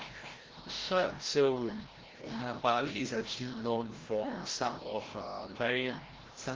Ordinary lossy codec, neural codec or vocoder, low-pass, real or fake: Opus, 16 kbps; codec, 16 kHz, 0.5 kbps, FreqCodec, larger model; 7.2 kHz; fake